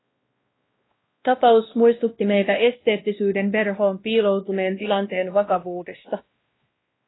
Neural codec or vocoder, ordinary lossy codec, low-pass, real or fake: codec, 16 kHz, 1 kbps, X-Codec, WavLM features, trained on Multilingual LibriSpeech; AAC, 16 kbps; 7.2 kHz; fake